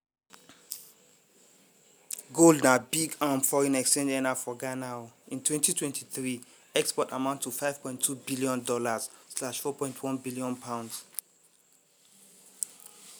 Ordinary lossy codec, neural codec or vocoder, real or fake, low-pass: none; none; real; none